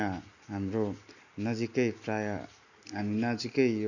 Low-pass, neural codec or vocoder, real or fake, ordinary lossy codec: 7.2 kHz; none; real; none